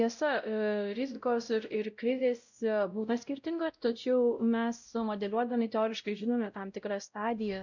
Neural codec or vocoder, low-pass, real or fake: codec, 16 kHz, 0.5 kbps, X-Codec, WavLM features, trained on Multilingual LibriSpeech; 7.2 kHz; fake